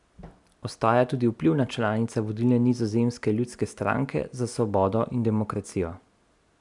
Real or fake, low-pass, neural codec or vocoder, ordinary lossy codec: real; 10.8 kHz; none; MP3, 96 kbps